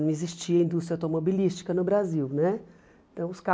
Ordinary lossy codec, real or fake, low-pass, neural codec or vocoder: none; real; none; none